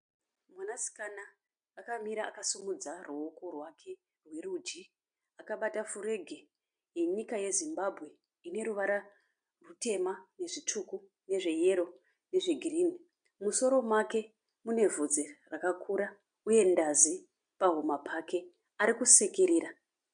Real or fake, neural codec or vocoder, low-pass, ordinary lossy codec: real; none; 9.9 kHz; MP3, 64 kbps